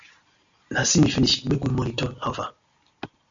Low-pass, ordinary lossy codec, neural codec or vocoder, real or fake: 7.2 kHz; AAC, 64 kbps; none; real